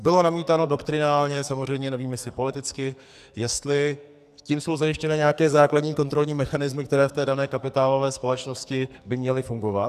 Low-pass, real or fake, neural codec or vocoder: 14.4 kHz; fake; codec, 44.1 kHz, 2.6 kbps, SNAC